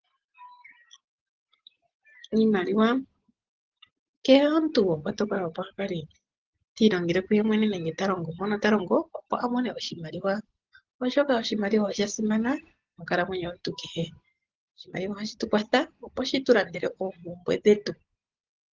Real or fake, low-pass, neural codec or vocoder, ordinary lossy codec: real; 7.2 kHz; none; Opus, 16 kbps